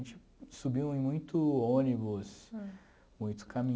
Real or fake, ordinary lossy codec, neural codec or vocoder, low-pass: real; none; none; none